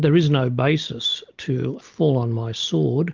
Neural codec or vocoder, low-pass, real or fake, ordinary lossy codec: none; 7.2 kHz; real; Opus, 24 kbps